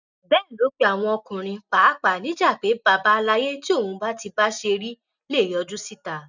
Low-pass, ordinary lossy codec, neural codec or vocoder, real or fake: 7.2 kHz; none; none; real